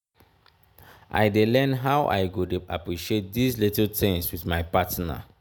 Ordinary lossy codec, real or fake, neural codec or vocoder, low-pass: none; real; none; none